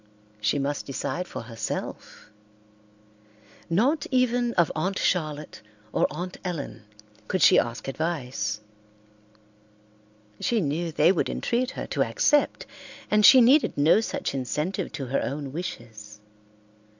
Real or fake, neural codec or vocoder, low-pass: real; none; 7.2 kHz